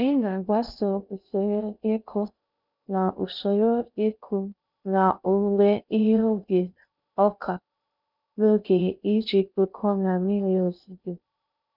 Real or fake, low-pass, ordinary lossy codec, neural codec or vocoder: fake; 5.4 kHz; none; codec, 16 kHz in and 24 kHz out, 0.6 kbps, FocalCodec, streaming, 2048 codes